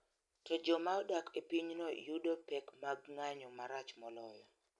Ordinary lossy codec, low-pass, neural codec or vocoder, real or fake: none; 10.8 kHz; none; real